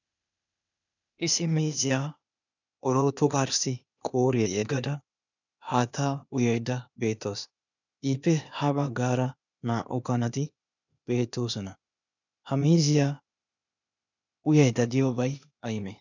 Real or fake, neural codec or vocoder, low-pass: fake; codec, 16 kHz, 0.8 kbps, ZipCodec; 7.2 kHz